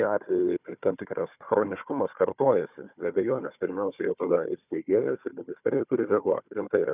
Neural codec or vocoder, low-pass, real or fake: codec, 16 kHz, 4 kbps, FunCodec, trained on Chinese and English, 50 frames a second; 3.6 kHz; fake